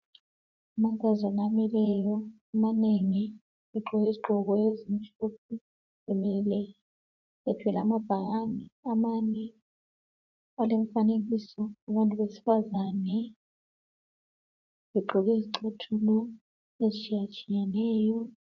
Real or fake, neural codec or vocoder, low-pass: fake; vocoder, 44.1 kHz, 80 mel bands, Vocos; 7.2 kHz